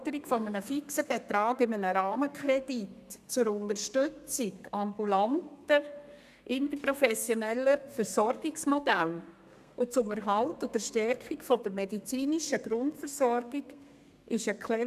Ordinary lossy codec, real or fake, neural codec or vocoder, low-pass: none; fake; codec, 32 kHz, 1.9 kbps, SNAC; 14.4 kHz